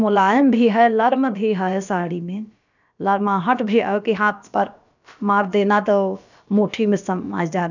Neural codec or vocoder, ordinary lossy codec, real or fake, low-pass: codec, 16 kHz, about 1 kbps, DyCAST, with the encoder's durations; none; fake; 7.2 kHz